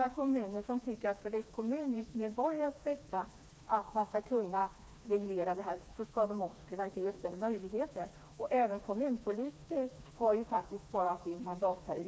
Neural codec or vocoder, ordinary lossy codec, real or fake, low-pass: codec, 16 kHz, 2 kbps, FreqCodec, smaller model; none; fake; none